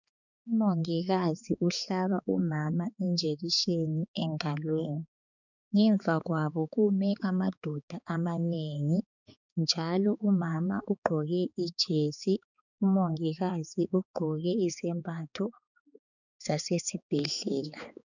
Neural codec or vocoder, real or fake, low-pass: codec, 16 kHz, 4 kbps, X-Codec, HuBERT features, trained on balanced general audio; fake; 7.2 kHz